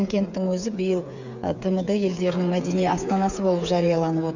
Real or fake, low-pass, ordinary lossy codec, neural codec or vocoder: fake; 7.2 kHz; none; codec, 16 kHz, 8 kbps, FreqCodec, smaller model